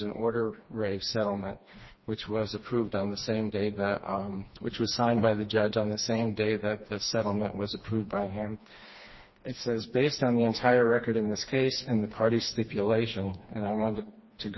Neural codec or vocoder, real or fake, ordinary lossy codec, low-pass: codec, 16 kHz, 2 kbps, FreqCodec, smaller model; fake; MP3, 24 kbps; 7.2 kHz